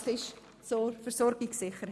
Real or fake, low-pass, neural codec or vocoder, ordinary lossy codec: real; none; none; none